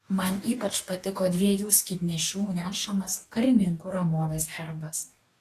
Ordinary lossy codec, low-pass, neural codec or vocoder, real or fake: AAC, 48 kbps; 14.4 kHz; codec, 44.1 kHz, 2.6 kbps, DAC; fake